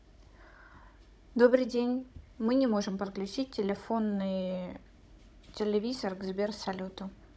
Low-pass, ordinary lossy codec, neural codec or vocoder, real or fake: none; none; codec, 16 kHz, 16 kbps, FunCodec, trained on Chinese and English, 50 frames a second; fake